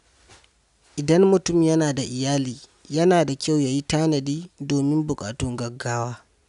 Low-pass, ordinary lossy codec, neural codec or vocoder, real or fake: 10.8 kHz; none; none; real